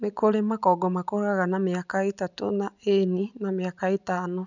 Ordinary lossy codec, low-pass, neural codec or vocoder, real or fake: none; 7.2 kHz; none; real